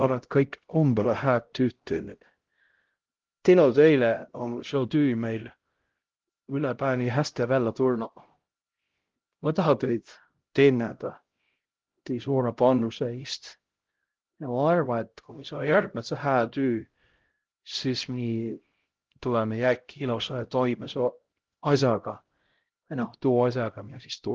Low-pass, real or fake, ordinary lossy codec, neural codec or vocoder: 7.2 kHz; fake; Opus, 16 kbps; codec, 16 kHz, 0.5 kbps, X-Codec, HuBERT features, trained on LibriSpeech